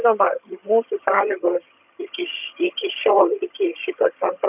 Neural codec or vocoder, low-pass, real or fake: vocoder, 22.05 kHz, 80 mel bands, HiFi-GAN; 3.6 kHz; fake